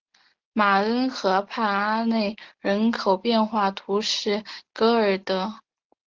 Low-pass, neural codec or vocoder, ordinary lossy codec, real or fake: 7.2 kHz; none; Opus, 16 kbps; real